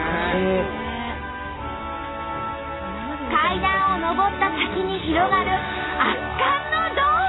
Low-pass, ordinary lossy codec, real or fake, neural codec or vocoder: 7.2 kHz; AAC, 16 kbps; real; none